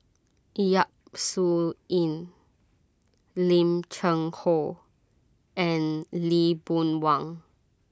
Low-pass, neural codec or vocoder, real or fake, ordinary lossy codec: none; none; real; none